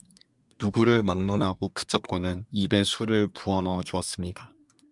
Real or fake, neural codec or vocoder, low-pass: fake; codec, 24 kHz, 1 kbps, SNAC; 10.8 kHz